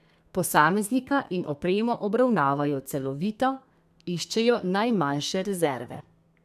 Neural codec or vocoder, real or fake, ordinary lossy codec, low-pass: codec, 32 kHz, 1.9 kbps, SNAC; fake; none; 14.4 kHz